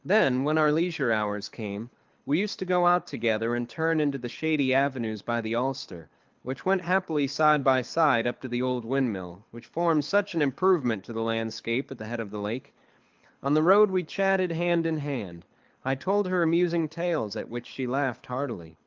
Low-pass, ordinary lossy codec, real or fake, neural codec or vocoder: 7.2 kHz; Opus, 32 kbps; fake; codec, 24 kHz, 6 kbps, HILCodec